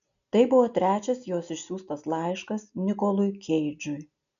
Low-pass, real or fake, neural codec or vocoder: 7.2 kHz; real; none